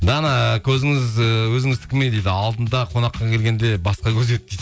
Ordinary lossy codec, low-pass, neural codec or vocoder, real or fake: none; none; none; real